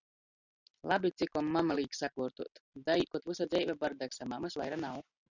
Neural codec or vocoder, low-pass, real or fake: none; 7.2 kHz; real